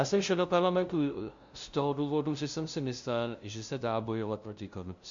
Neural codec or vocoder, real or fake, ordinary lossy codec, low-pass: codec, 16 kHz, 0.5 kbps, FunCodec, trained on LibriTTS, 25 frames a second; fake; MP3, 64 kbps; 7.2 kHz